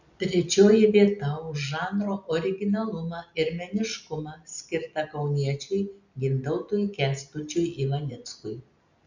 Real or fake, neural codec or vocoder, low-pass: real; none; 7.2 kHz